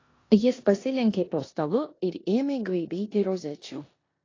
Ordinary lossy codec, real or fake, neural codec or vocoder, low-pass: AAC, 32 kbps; fake; codec, 16 kHz in and 24 kHz out, 0.9 kbps, LongCat-Audio-Codec, four codebook decoder; 7.2 kHz